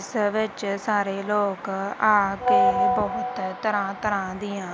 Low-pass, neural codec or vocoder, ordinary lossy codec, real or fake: none; none; none; real